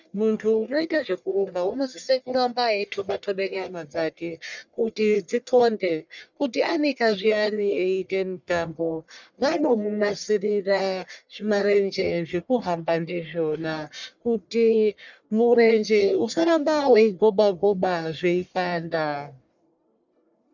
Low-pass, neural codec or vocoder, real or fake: 7.2 kHz; codec, 44.1 kHz, 1.7 kbps, Pupu-Codec; fake